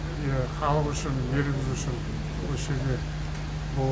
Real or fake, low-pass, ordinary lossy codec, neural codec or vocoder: real; none; none; none